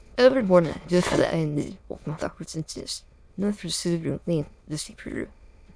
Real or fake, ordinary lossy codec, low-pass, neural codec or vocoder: fake; none; none; autoencoder, 22.05 kHz, a latent of 192 numbers a frame, VITS, trained on many speakers